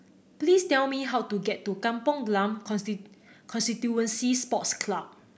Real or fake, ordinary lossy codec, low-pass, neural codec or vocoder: real; none; none; none